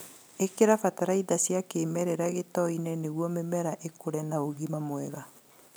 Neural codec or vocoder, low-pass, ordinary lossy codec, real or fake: none; none; none; real